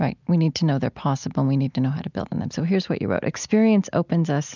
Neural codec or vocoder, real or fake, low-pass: none; real; 7.2 kHz